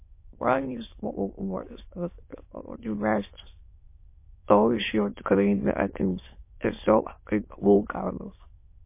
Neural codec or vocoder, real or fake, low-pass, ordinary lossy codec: autoencoder, 22.05 kHz, a latent of 192 numbers a frame, VITS, trained on many speakers; fake; 3.6 kHz; MP3, 24 kbps